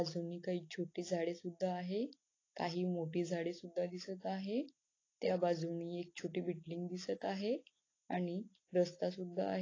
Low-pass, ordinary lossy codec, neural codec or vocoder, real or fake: 7.2 kHz; AAC, 32 kbps; none; real